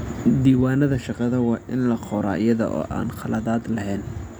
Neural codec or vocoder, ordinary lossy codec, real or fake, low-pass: none; none; real; none